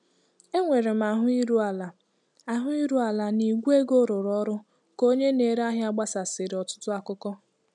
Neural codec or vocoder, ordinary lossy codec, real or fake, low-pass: none; none; real; 10.8 kHz